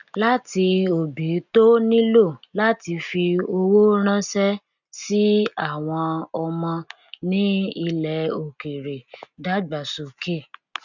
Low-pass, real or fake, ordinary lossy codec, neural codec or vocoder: 7.2 kHz; real; none; none